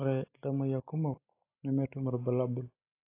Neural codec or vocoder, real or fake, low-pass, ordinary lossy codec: none; real; 3.6 kHz; AAC, 24 kbps